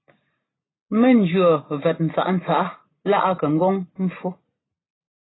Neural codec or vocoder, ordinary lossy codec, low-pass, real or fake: none; AAC, 16 kbps; 7.2 kHz; real